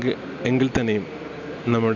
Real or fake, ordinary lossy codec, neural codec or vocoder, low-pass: real; none; none; 7.2 kHz